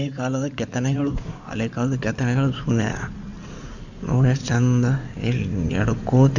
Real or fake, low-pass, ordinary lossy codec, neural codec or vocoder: fake; 7.2 kHz; none; codec, 16 kHz in and 24 kHz out, 2.2 kbps, FireRedTTS-2 codec